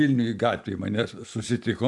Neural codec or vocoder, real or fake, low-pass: vocoder, 44.1 kHz, 128 mel bands every 256 samples, BigVGAN v2; fake; 10.8 kHz